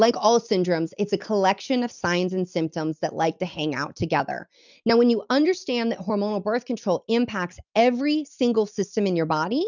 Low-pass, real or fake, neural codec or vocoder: 7.2 kHz; real; none